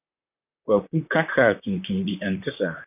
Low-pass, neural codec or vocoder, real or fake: 3.6 kHz; vocoder, 44.1 kHz, 128 mel bands, Pupu-Vocoder; fake